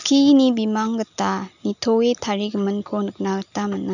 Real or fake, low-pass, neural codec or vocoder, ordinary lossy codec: real; 7.2 kHz; none; none